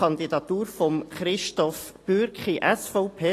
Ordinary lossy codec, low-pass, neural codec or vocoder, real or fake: AAC, 48 kbps; 14.4 kHz; none; real